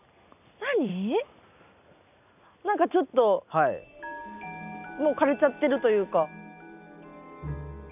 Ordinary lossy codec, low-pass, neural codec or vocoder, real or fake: none; 3.6 kHz; none; real